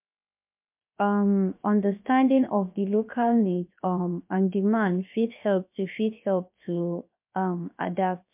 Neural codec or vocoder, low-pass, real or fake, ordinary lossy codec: codec, 16 kHz, 0.7 kbps, FocalCodec; 3.6 kHz; fake; MP3, 32 kbps